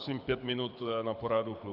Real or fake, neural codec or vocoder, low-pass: fake; codec, 24 kHz, 6 kbps, HILCodec; 5.4 kHz